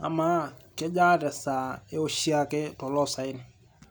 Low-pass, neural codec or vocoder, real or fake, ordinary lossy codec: none; none; real; none